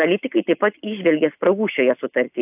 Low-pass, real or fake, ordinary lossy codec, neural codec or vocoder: 3.6 kHz; real; AAC, 32 kbps; none